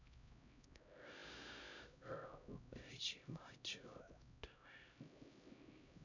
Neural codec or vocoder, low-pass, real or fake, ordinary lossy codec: codec, 16 kHz, 0.5 kbps, X-Codec, HuBERT features, trained on LibriSpeech; 7.2 kHz; fake; none